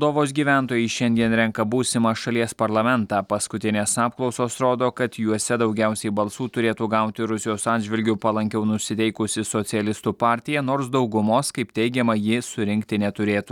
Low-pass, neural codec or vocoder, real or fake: 19.8 kHz; none; real